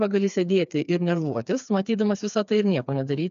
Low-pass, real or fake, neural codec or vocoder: 7.2 kHz; fake; codec, 16 kHz, 4 kbps, FreqCodec, smaller model